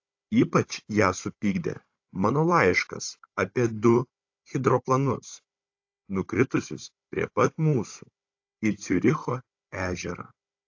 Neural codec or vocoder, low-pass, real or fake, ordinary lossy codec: codec, 16 kHz, 16 kbps, FunCodec, trained on Chinese and English, 50 frames a second; 7.2 kHz; fake; AAC, 48 kbps